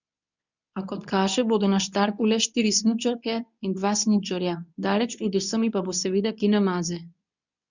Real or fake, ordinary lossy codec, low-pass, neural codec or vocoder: fake; none; 7.2 kHz; codec, 24 kHz, 0.9 kbps, WavTokenizer, medium speech release version 2